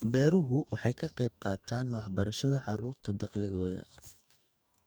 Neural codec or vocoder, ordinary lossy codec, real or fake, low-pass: codec, 44.1 kHz, 2.6 kbps, DAC; none; fake; none